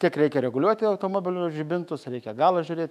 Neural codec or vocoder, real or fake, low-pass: autoencoder, 48 kHz, 128 numbers a frame, DAC-VAE, trained on Japanese speech; fake; 14.4 kHz